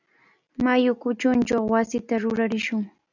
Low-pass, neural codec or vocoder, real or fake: 7.2 kHz; none; real